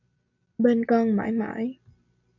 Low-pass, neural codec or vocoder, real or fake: 7.2 kHz; none; real